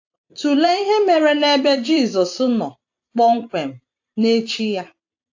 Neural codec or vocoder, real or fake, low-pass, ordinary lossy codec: vocoder, 44.1 kHz, 128 mel bands every 256 samples, BigVGAN v2; fake; 7.2 kHz; AAC, 48 kbps